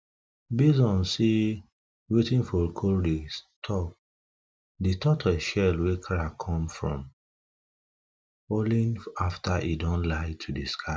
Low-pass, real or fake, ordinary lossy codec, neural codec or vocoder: none; real; none; none